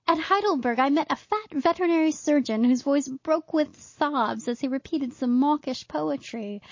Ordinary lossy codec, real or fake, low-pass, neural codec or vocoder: MP3, 32 kbps; real; 7.2 kHz; none